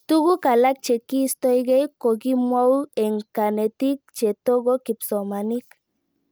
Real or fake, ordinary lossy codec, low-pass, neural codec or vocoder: real; none; none; none